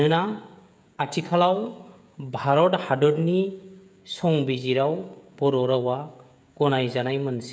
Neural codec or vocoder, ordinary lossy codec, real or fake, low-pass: codec, 16 kHz, 16 kbps, FreqCodec, smaller model; none; fake; none